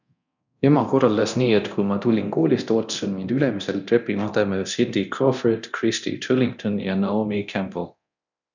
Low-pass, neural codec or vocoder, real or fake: 7.2 kHz; codec, 24 kHz, 0.9 kbps, DualCodec; fake